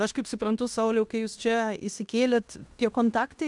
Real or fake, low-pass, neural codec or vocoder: fake; 10.8 kHz; codec, 16 kHz in and 24 kHz out, 0.9 kbps, LongCat-Audio-Codec, fine tuned four codebook decoder